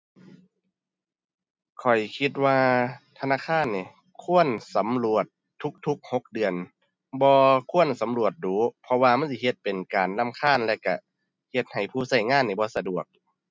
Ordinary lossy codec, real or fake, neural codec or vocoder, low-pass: none; real; none; none